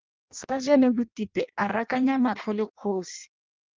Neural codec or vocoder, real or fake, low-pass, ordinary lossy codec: codec, 16 kHz in and 24 kHz out, 0.6 kbps, FireRedTTS-2 codec; fake; 7.2 kHz; Opus, 24 kbps